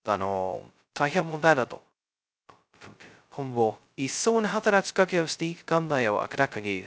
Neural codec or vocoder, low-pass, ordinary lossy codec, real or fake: codec, 16 kHz, 0.2 kbps, FocalCodec; none; none; fake